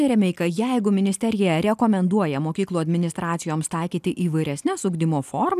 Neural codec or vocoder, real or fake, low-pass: none; real; 14.4 kHz